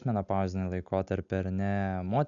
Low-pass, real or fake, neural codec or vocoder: 7.2 kHz; real; none